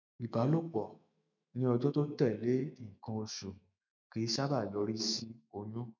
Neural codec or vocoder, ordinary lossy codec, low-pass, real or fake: autoencoder, 48 kHz, 128 numbers a frame, DAC-VAE, trained on Japanese speech; none; 7.2 kHz; fake